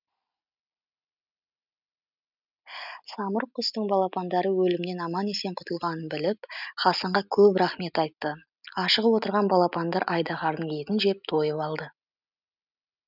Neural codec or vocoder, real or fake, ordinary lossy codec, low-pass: none; real; none; 5.4 kHz